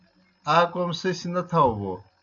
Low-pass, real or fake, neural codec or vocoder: 7.2 kHz; real; none